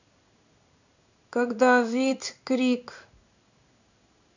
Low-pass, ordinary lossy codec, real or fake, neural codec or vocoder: 7.2 kHz; none; fake; codec, 16 kHz in and 24 kHz out, 1 kbps, XY-Tokenizer